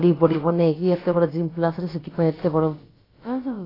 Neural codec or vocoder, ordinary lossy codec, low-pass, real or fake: codec, 16 kHz, about 1 kbps, DyCAST, with the encoder's durations; AAC, 24 kbps; 5.4 kHz; fake